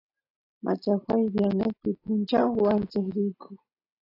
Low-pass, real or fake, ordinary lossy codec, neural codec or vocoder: 5.4 kHz; real; AAC, 24 kbps; none